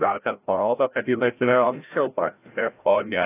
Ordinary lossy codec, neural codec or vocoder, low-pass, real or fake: AAC, 24 kbps; codec, 16 kHz, 0.5 kbps, FreqCodec, larger model; 3.6 kHz; fake